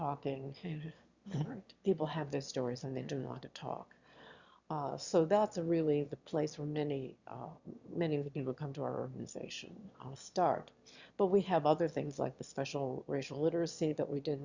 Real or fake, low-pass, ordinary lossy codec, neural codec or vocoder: fake; 7.2 kHz; Opus, 64 kbps; autoencoder, 22.05 kHz, a latent of 192 numbers a frame, VITS, trained on one speaker